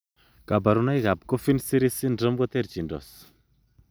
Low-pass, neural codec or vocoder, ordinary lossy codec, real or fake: none; none; none; real